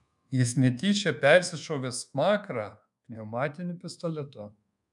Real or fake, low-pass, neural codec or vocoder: fake; 10.8 kHz; codec, 24 kHz, 1.2 kbps, DualCodec